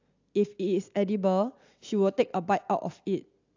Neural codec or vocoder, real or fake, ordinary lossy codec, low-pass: none; real; AAC, 48 kbps; 7.2 kHz